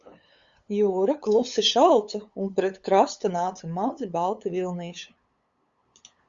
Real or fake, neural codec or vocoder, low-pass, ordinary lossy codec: fake; codec, 16 kHz, 8 kbps, FunCodec, trained on LibriTTS, 25 frames a second; 7.2 kHz; Opus, 64 kbps